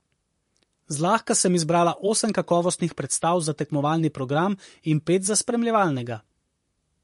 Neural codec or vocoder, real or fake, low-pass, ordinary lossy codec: vocoder, 44.1 kHz, 128 mel bands, Pupu-Vocoder; fake; 14.4 kHz; MP3, 48 kbps